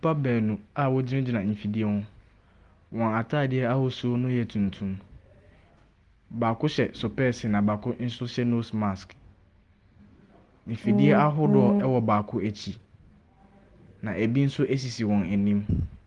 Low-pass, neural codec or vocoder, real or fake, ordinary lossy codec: 10.8 kHz; none; real; Opus, 24 kbps